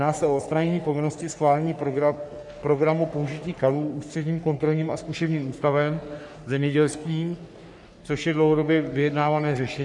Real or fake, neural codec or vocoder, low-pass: fake; codec, 44.1 kHz, 3.4 kbps, Pupu-Codec; 10.8 kHz